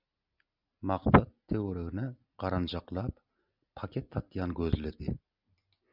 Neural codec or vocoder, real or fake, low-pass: none; real; 5.4 kHz